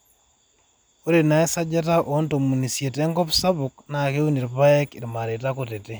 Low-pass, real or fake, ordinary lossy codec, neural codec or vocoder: none; real; none; none